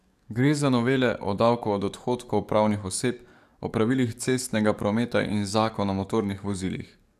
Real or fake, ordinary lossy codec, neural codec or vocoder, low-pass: fake; none; codec, 44.1 kHz, 7.8 kbps, DAC; 14.4 kHz